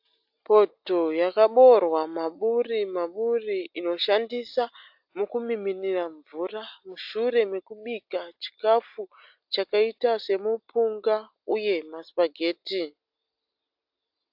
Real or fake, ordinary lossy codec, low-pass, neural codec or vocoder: real; AAC, 48 kbps; 5.4 kHz; none